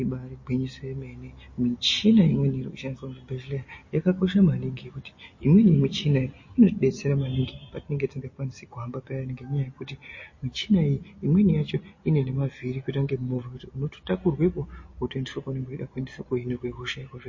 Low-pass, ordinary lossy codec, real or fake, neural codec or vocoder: 7.2 kHz; MP3, 32 kbps; real; none